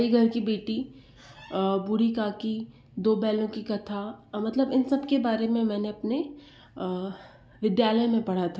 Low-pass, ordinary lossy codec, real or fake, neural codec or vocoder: none; none; real; none